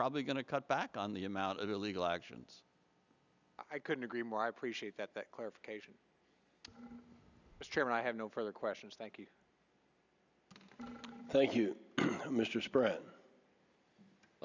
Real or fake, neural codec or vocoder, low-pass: real; none; 7.2 kHz